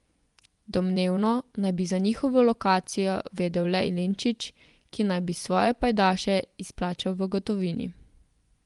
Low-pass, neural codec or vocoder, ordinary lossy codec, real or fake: 10.8 kHz; vocoder, 24 kHz, 100 mel bands, Vocos; Opus, 32 kbps; fake